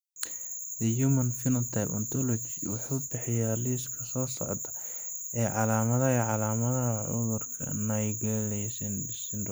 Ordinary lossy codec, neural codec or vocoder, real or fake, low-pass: none; none; real; none